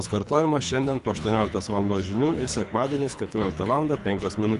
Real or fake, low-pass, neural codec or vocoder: fake; 10.8 kHz; codec, 24 kHz, 3 kbps, HILCodec